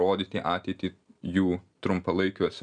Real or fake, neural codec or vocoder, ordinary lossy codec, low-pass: real; none; MP3, 96 kbps; 9.9 kHz